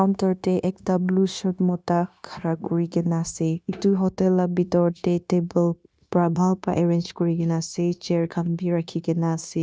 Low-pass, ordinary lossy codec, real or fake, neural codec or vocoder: none; none; fake; codec, 16 kHz, 0.9 kbps, LongCat-Audio-Codec